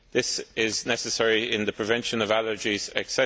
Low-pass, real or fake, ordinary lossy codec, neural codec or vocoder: none; real; none; none